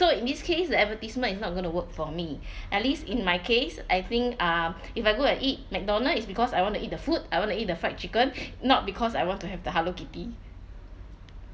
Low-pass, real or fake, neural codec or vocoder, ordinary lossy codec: none; real; none; none